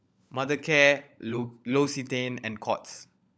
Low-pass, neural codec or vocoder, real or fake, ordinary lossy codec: none; codec, 16 kHz, 16 kbps, FunCodec, trained on LibriTTS, 50 frames a second; fake; none